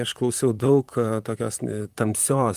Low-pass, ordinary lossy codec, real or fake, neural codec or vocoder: 14.4 kHz; Opus, 32 kbps; fake; vocoder, 44.1 kHz, 128 mel bands, Pupu-Vocoder